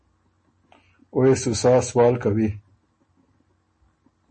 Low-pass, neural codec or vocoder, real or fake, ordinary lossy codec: 10.8 kHz; none; real; MP3, 32 kbps